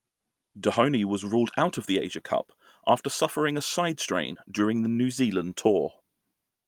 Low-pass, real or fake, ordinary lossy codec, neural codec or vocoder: 19.8 kHz; real; Opus, 32 kbps; none